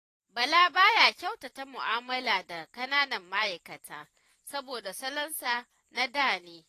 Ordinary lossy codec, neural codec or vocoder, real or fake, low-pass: AAC, 48 kbps; vocoder, 44.1 kHz, 128 mel bands every 512 samples, BigVGAN v2; fake; 14.4 kHz